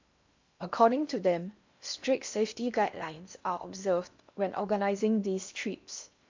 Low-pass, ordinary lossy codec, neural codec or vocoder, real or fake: 7.2 kHz; MP3, 64 kbps; codec, 16 kHz in and 24 kHz out, 0.8 kbps, FocalCodec, streaming, 65536 codes; fake